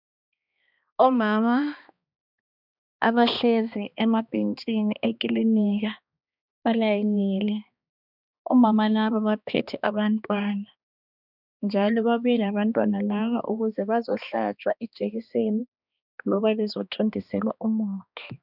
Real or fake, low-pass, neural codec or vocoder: fake; 5.4 kHz; codec, 16 kHz, 2 kbps, X-Codec, HuBERT features, trained on balanced general audio